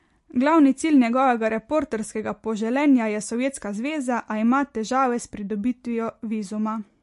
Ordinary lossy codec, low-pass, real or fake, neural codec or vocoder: MP3, 48 kbps; 14.4 kHz; real; none